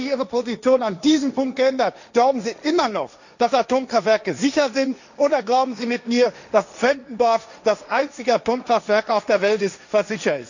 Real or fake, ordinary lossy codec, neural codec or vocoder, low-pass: fake; none; codec, 16 kHz, 1.1 kbps, Voila-Tokenizer; 7.2 kHz